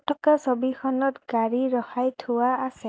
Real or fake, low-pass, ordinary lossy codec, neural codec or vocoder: real; none; none; none